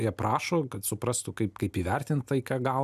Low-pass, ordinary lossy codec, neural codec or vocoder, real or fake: 14.4 kHz; AAC, 96 kbps; none; real